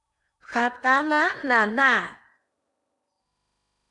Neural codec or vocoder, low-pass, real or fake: codec, 16 kHz in and 24 kHz out, 0.8 kbps, FocalCodec, streaming, 65536 codes; 10.8 kHz; fake